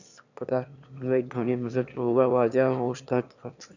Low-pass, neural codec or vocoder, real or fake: 7.2 kHz; autoencoder, 22.05 kHz, a latent of 192 numbers a frame, VITS, trained on one speaker; fake